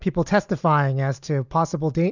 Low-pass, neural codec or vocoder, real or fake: 7.2 kHz; none; real